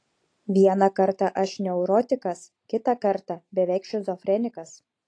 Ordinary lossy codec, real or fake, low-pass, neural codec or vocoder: AAC, 48 kbps; real; 9.9 kHz; none